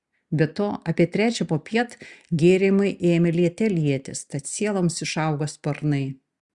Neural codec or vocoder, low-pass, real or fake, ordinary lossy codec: none; 10.8 kHz; real; Opus, 64 kbps